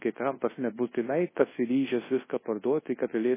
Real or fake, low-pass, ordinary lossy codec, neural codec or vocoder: fake; 3.6 kHz; MP3, 16 kbps; codec, 24 kHz, 0.9 kbps, WavTokenizer, large speech release